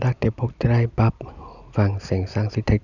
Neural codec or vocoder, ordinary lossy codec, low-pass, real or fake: none; none; 7.2 kHz; real